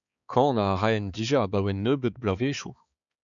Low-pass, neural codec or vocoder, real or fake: 7.2 kHz; codec, 16 kHz, 4 kbps, X-Codec, HuBERT features, trained on balanced general audio; fake